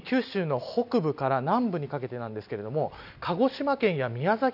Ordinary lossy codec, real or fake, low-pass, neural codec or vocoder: none; real; 5.4 kHz; none